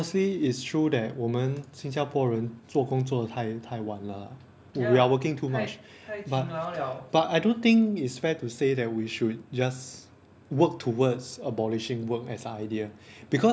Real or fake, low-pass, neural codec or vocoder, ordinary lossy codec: real; none; none; none